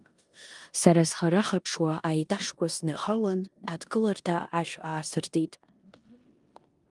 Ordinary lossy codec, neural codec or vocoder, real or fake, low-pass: Opus, 32 kbps; codec, 16 kHz in and 24 kHz out, 0.9 kbps, LongCat-Audio-Codec, four codebook decoder; fake; 10.8 kHz